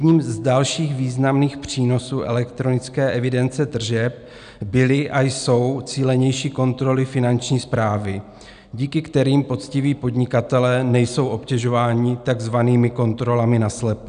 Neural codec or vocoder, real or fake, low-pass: none; real; 9.9 kHz